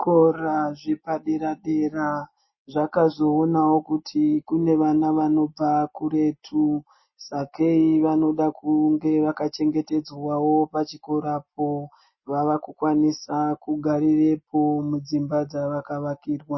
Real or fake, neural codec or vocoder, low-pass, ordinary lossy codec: real; none; 7.2 kHz; MP3, 24 kbps